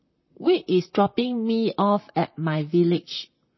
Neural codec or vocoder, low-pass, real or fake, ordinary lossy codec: vocoder, 44.1 kHz, 128 mel bands, Pupu-Vocoder; 7.2 kHz; fake; MP3, 24 kbps